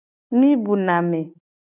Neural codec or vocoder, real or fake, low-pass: none; real; 3.6 kHz